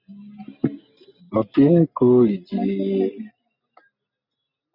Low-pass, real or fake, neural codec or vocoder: 5.4 kHz; real; none